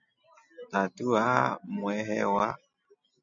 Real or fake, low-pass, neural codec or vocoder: real; 7.2 kHz; none